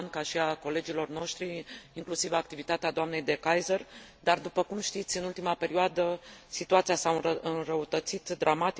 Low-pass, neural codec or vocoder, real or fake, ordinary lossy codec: none; none; real; none